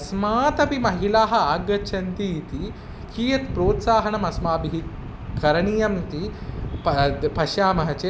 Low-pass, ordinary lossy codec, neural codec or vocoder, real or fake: none; none; none; real